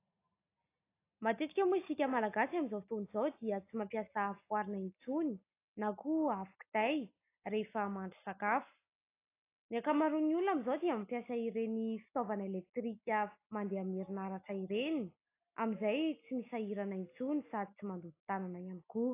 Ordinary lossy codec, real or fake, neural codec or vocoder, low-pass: AAC, 24 kbps; real; none; 3.6 kHz